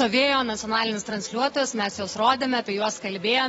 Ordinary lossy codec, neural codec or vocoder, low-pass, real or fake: AAC, 24 kbps; none; 19.8 kHz; real